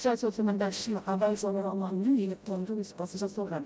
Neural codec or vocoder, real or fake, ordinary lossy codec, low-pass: codec, 16 kHz, 0.5 kbps, FreqCodec, smaller model; fake; none; none